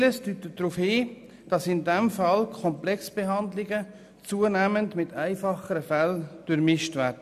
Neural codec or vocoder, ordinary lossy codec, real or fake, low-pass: none; none; real; 14.4 kHz